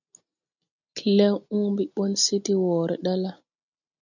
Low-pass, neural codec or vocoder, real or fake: 7.2 kHz; none; real